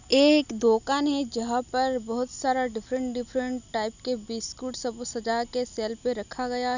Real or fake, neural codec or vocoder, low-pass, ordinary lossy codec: real; none; 7.2 kHz; none